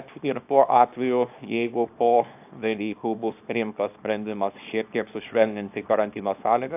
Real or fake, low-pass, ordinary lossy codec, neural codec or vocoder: fake; 3.6 kHz; AAC, 32 kbps; codec, 24 kHz, 0.9 kbps, WavTokenizer, small release